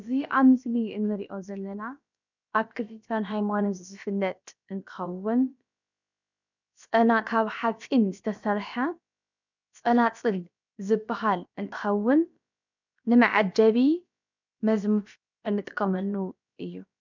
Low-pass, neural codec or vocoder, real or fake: 7.2 kHz; codec, 16 kHz, about 1 kbps, DyCAST, with the encoder's durations; fake